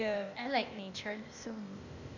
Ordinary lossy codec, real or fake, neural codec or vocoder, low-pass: none; fake; codec, 16 kHz, 0.8 kbps, ZipCodec; 7.2 kHz